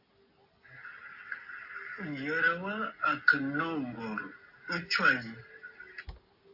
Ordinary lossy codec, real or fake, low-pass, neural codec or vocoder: AAC, 48 kbps; real; 5.4 kHz; none